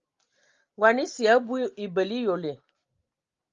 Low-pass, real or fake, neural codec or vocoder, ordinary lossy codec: 7.2 kHz; real; none; Opus, 32 kbps